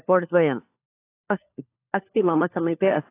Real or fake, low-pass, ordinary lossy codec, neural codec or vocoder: fake; 3.6 kHz; AAC, 24 kbps; codec, 16 kHz, 4 kbps, FunCodec, trained on LibriTTS, 50 frames a second